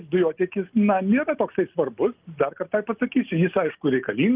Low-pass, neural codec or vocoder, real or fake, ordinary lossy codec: 3.6 kHz; none; real; Opus, 24 kbps